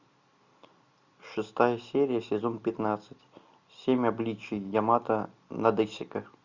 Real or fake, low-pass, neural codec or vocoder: real; 7.2 kHz; none